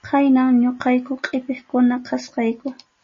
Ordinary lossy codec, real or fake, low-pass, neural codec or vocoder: MP3, 32 kbps; real; 7.2 kHz; none